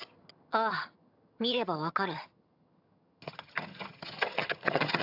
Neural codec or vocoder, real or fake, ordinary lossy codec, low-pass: vocoder, 22.05 kHz, 80 mel bands, HiFi-GAN; fake; AAC, 48 kbps; 5.4 kHz